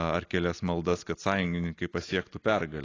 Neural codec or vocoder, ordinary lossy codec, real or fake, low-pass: none; AAC, 32 kbps; real; 7.2 kHz